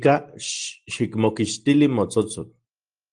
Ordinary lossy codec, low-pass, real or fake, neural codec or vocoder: Opus, 24 kbps; 9.9 kHz; real; none